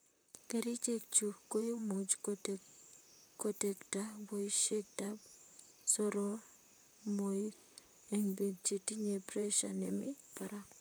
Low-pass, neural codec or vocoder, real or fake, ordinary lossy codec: none; vocoder, 44.1 kHz, 128 mel bands, Pupu-Vocoder; fake; none